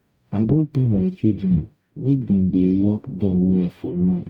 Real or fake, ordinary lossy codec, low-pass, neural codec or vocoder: fake; none; 19.8 kHz; codec, 44.1 kHz, 0.9 kbps, DAC